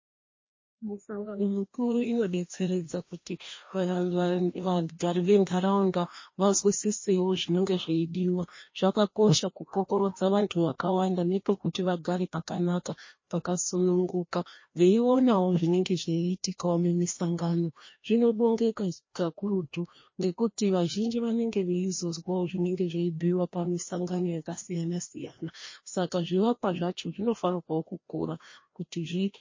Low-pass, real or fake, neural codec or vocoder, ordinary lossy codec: 7.2 kHz; fake; codec, 16 kHz, 1 kbps, FreqCodec, larger model; MP3, 32 kbps